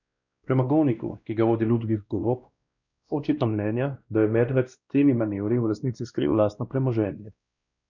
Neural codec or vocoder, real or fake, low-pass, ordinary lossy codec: codec, 16 kHz, 1 kbps, X-Codec, WavLM features, trained on Multilingual LibriSpeech; fake; 7.2 kHz; none